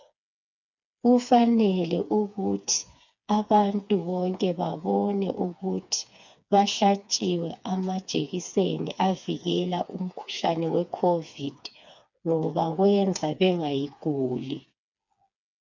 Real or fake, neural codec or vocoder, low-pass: fake; codec, 16 kHz, 4 kbps, FreqCodec, smaller model; 7.2 kHz